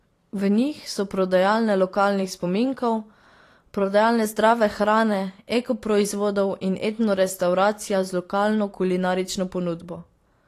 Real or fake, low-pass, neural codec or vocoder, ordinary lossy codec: fake; 14.4 kHz; vocoder, 44.1 kHz, 128 mel bands every 512 samples, BigVGAN v2; AAC, 48 kbps